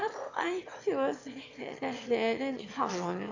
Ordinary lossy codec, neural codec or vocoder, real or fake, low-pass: AAC, 48 kbps; autoencoder, 22.05 kHz, a latent of 192 numbers a frame, VITS, trained on one speaker; fake; 7.2 kHz